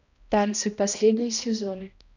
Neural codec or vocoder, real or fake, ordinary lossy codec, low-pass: codec, 16 kHz, 1 kbps, X-Codec, HuBERT features, trained on balanced general audio; fake; none; 7.2 kHz